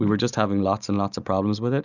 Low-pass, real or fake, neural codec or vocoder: 7.2 kHz; real; none